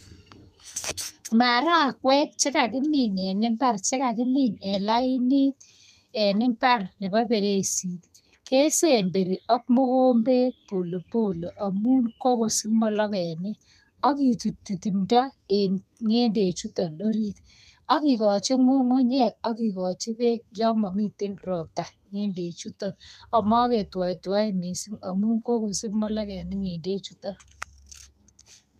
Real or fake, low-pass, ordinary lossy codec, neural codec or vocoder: fake; 14.4 kHz; MP3, 96 kbps; codec, 32 kHz, 1.9 kbps, SNAC